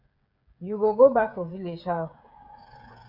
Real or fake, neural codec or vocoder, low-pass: fake; codec, 16 kHz, 16 kbps, FreqCodec, smaller model; 5.4 kHz